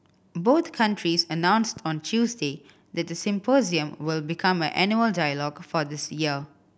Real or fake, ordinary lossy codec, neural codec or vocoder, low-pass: real; none; none; none